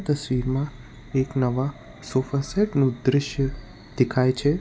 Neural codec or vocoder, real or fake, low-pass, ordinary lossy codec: none; real; none; none